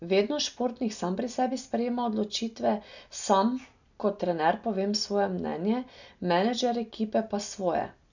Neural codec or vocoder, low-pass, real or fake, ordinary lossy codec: none; 7.2 kHz; real; none